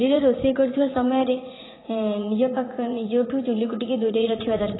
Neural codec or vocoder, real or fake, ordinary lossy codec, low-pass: vocoder, 44.1 kHz, 80 mel bands, Vocos; fake; AAC, 16 kbps; 7.2 kHz